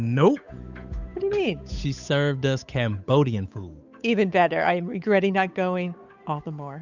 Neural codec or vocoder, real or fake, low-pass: codec, 16 kHz, 8 kbps, FunCodec, trained on Chinese and English, 25 frames a second; fake; 7.2 kHz